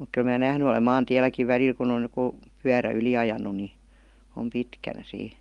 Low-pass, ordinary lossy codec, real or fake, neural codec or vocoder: 10.8 kHz; Opus, 24 kbps; real; none